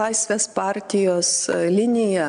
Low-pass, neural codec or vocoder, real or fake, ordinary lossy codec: 9.9 kHz; none; real; AAC, 96 kbps